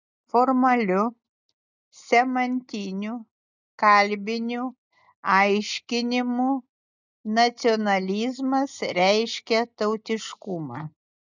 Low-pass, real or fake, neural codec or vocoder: 7.2 kHz; real; none